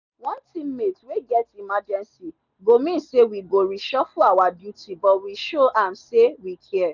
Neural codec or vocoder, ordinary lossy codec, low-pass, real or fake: none; none; 7.2 kHz; real